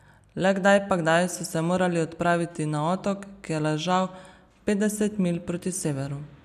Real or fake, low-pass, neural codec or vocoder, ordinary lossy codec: real; 14.4 kHz; none; none